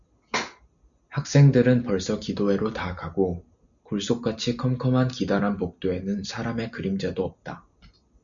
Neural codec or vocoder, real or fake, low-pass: none; real; 7.2 kHz